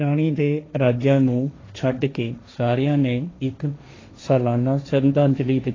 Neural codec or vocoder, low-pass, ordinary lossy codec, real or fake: codec, 16 kHz, 1.1 kbps, Voila-Tokenizer; 7.2 kHz; AAC, 32 kbps; fake